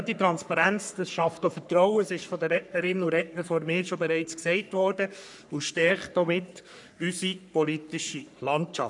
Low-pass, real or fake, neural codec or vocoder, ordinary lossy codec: 10.8 kHz; fake; codec, 44.1 kHz, 3.4 kbps, Pupu-Codec; none